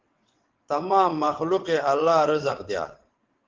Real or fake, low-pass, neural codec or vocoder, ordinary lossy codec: fake; 7.2 kHz; vocoder, 22.05 kHz, 80 mel bands, WaveNeXt; Opus, 16 kbps